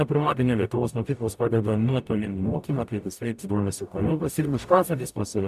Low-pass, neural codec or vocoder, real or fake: 14.4 kHz; codec, 44.1 kHz, 0.9 kbps, DAC; fake